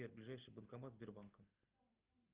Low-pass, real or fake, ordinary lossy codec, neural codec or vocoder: 3.6 kHz; real; Opus, 32 kbps; none